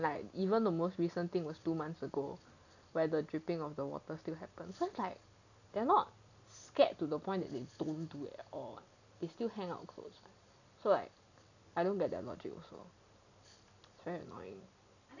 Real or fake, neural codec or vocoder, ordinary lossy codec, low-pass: real; none; none; 7.2 kHz